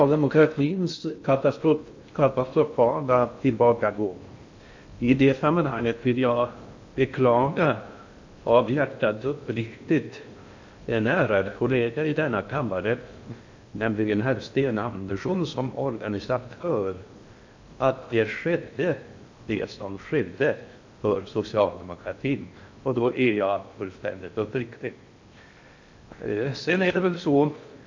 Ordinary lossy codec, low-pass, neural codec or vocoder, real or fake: MP3, 48 kbps; 7.2 kHz; codec, 16 kHz in and 24 kHz out, 0.6 kbps, FocalCodec, streaming, 4096 codes; fake